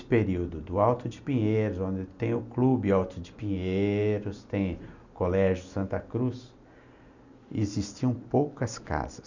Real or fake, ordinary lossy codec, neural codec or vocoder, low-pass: real; none; none; 7.2 kHz